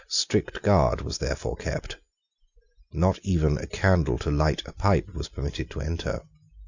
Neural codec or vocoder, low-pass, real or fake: none; 7.2 kHz; real